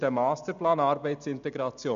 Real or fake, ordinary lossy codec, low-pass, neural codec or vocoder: real; MP3, 96 kbps; 7.2 kHz; none